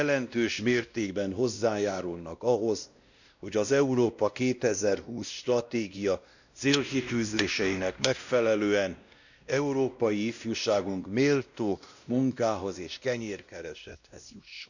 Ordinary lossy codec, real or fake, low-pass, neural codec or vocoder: none; fake; 7.2 kHz; codec, 16 kHz, 1 kbps, X-Codec, WavLM features, trained on Multilingual LibriSpeech